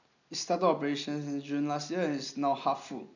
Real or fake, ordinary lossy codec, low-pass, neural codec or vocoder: real; none; 7.2 kHz; none